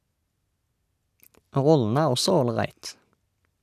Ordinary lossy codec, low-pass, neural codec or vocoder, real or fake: none; 14.4 kHz; none; real